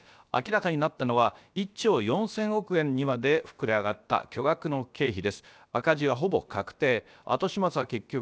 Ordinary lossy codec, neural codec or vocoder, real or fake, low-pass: none; codec, 16 kHz, about 1 kbps, DyCAST, with the encoder's durations; fake; none